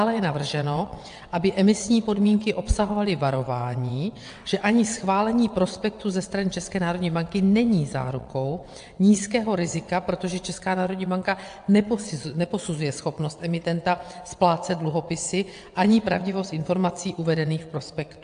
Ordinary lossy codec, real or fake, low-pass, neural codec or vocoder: AAC, 64 kbps; fake; 9.9 kHz; vocoder, 22.05 kHz, 80 mel bands, WaveNeXt